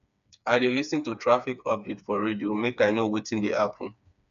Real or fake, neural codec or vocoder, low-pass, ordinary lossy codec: fake; codec, 16 kHz, 4 kbps, FreqCodec, smaller model; 7.2 kHz; none